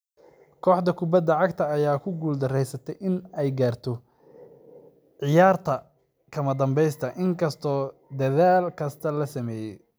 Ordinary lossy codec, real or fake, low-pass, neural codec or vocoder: none; real; none; none